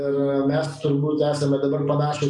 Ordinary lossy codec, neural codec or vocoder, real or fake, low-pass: MP3, 96 kbps; none; real; 10.8 kHz